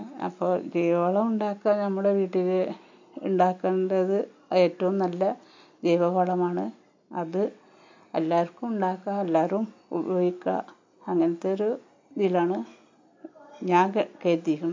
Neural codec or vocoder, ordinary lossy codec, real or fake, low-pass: none; MP3, 48 kbps; real; 7.2 kHz